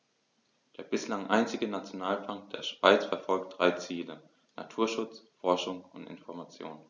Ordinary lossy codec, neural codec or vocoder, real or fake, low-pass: none; none; real; 7.2 kHz